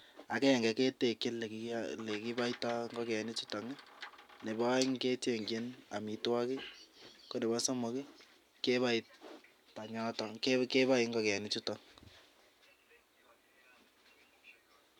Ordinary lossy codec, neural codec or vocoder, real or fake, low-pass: none; none; real; 19.8 kHz